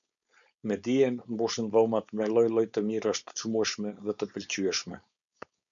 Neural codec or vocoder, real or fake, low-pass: codec, 16 kHz, 4.8 kbps, FACodec; fake; 7.2 kHz